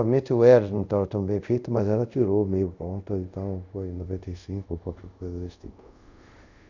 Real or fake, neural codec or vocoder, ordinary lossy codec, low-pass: fake; codec, 24 kHz, 0.5 kbps, DualCodec; none; 7.2 kHz